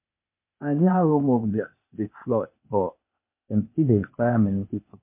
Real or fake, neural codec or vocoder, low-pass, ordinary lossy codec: fake; codec, 16 kHz, 0.8 kbps, ZipCodec; 3.6 kHz; MP3, 32 kbps